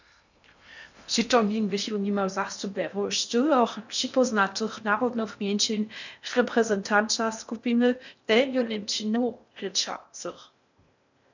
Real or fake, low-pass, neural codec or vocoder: fake; 7.2 kHz; codec, 16 kHz in and 24 kHz out, 0.6 kbps, FocalCodec, streaming, 2048 codes